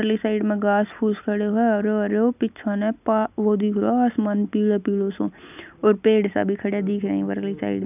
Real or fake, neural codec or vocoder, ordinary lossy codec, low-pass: real; none; none; 3.6 kHz